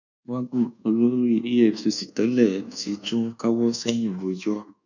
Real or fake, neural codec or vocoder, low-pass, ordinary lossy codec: fake; codec, 24 kHz, 1.2 kbps, DualCodec; 7.2 kHz; none